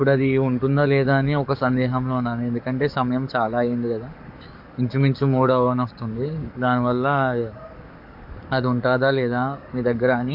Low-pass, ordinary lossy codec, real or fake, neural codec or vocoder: 5.4 kHz; MP3, 48 kbps; fake; codec, 44.1 kHz, 7.8 kbps, DAC